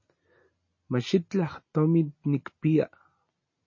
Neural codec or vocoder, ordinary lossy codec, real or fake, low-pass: none; MP3, 32 kbps; real; 7.2 kHz